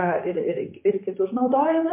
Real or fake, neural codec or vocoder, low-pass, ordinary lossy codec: fake; codec, 16 kHz, 4 kbps, X-Codec, HuBERT features, trained on general audio; 3.6 kHz; MP3, 24 kbps